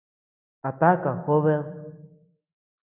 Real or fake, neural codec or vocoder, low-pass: real; none; 3.6 kHz